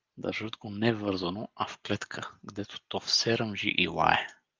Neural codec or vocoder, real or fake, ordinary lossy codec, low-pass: none; real; Opus, 32 kbps; 7.2 kHz